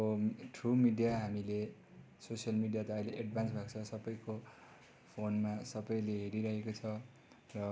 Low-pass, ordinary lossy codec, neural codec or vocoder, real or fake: none; none; none; real